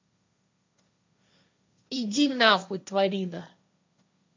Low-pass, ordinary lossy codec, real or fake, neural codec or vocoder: 7.2 kHz; MP3, 48 kbps; fake; codec, 16 kHz, 1.1 kbps, Voila-Tokenizer